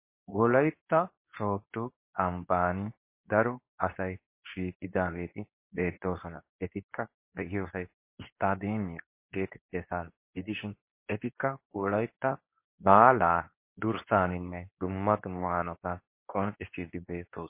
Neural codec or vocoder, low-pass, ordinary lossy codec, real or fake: codec, 24 kHz, 0.9 kbps, WavTokenizer, medium speech release version 2; 3.6 kHz; MP3, 24 kbps; fake